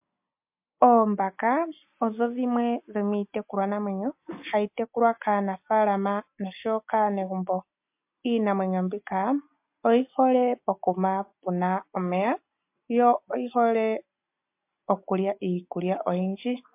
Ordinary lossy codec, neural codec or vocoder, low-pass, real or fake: MP3, 32 kbps; none; 3.6 kHz; real